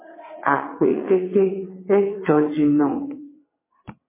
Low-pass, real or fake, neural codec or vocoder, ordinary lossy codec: 3.6 kHz; fake; vocoder, 22.05 kHz, 80 mel bands, WaveNeXt; MP3, 16 kbps